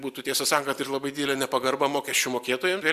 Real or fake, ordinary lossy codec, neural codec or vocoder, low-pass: real; Opus, 64 kbps; none; 14.4 kHz